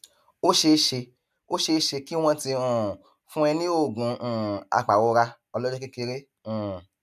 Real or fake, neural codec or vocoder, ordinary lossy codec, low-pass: real; none; none; 14.4 kHz